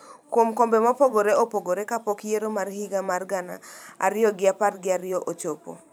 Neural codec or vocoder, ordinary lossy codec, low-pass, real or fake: vocoder, 44.1 kHz, 128 mel bands every 512 samples, BigVGAN v2; none; none; fake